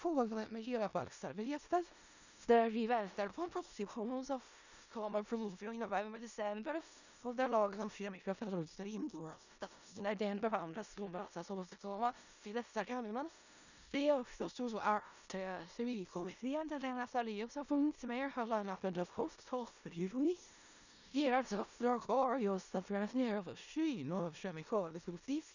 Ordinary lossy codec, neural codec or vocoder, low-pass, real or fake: none; codec, 16 kHz in and 24 kHz out, 0.4 kbps, LongCat-Audio-Codec, four codebook decoder; 7.2 kHz; fake